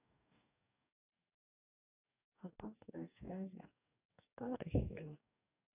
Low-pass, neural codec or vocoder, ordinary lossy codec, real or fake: 3.6 kHz; codec, 44.1 kHz, 2.6 kbps, DAC; none; fake